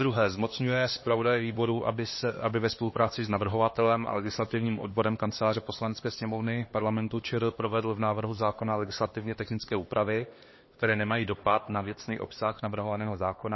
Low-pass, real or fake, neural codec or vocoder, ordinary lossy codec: 7.2 kHz; fake; codec, 16 kHz, 2 kbps, X-Codec, HuBERT features, trained on LibriSpeech; MP3, 24 kbps